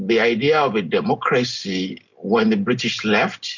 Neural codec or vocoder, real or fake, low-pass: none; real; 7.2 kHz